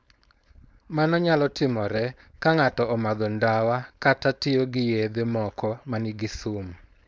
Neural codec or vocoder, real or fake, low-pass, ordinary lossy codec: codec, 16 kHz, 4.8 kbps, FACodec; fake; none; none